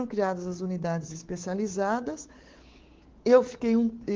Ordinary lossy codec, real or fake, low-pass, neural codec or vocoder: Opus, 16 kbps; fake; 7.2 kHz; codec, 24 kHz, 3.1 kbps, DualCodec